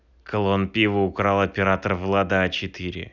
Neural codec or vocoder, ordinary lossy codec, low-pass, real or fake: none; none; 7.2 kHz; real